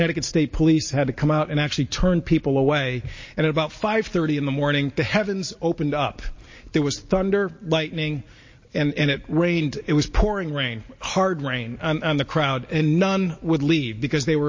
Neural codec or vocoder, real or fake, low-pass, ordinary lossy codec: none; real; 7.2 kHz; MP3, 32 kbps